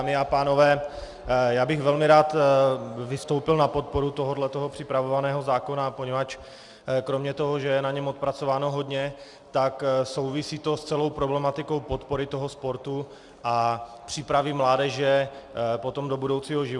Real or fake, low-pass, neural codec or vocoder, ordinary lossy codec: real; 10.8 kHz; none; Opus, 64 kbps